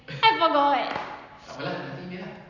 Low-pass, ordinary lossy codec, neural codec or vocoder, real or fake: 7.2 kHz; none; none; real